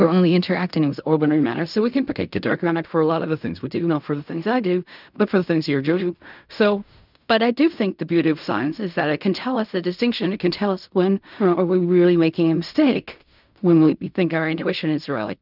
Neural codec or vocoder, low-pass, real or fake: codec, 16 kHz in and 24 kHz out, 0.4 kbps, LongCat-Audio-Codec, fine tuned four codebook decoder; 5.4 kHz; fake